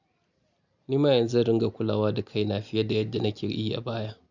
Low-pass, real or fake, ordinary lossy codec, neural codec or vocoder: 7.2 kHz; real; none; none